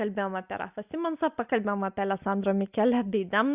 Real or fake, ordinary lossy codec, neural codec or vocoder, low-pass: real; Opus, 64 kbps; none; 3.6 kHz